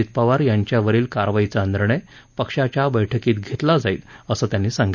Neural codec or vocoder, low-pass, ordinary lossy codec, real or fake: none; 7.2 kHz; none; real